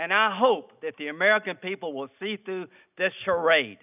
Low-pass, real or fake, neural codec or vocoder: 3.6 kHz; real; none